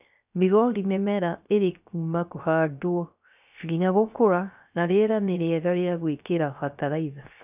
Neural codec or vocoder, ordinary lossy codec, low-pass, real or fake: codec, 16 kHz, 0.3 kbps, FocalCodec; none; 3.6 kHz; fake